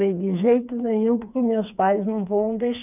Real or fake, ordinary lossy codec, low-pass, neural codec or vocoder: fake; none; 3.6 kHz; codec, 16 kHz, 4 kbps, FreqCodec, smaller model